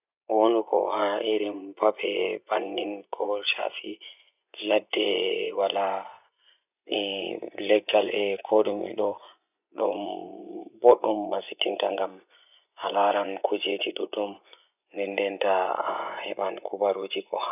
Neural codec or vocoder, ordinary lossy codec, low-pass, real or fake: vocoder, 24 kHz, 100 mel bands, Vocos; none; 3.6 kHz; fake